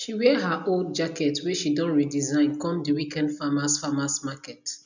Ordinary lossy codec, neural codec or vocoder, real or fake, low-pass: none; none; real; 7.2 kHz